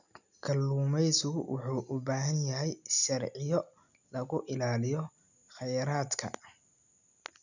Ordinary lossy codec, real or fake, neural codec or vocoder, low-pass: none; real; none; 7.2 kHz